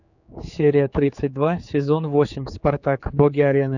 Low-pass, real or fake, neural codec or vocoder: 7.2 kHz; fake; codec, 16 kHz, 4 kbps, X-Codec, HuBERT features, trained on general audio